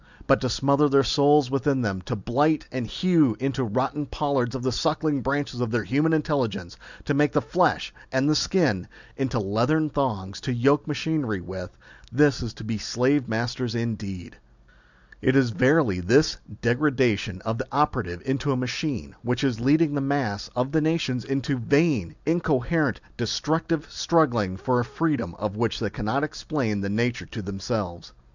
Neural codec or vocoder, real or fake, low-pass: none; real; 7.2 kHz